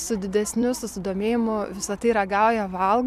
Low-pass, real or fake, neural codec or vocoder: 14.4 kHz; fake; vocoder, 44.1 kHz, 128 mel bands every 256 samples, BigVGAN v2